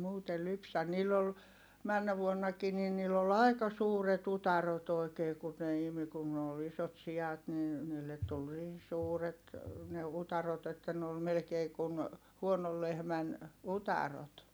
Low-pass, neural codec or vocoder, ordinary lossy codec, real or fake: none; none; none; real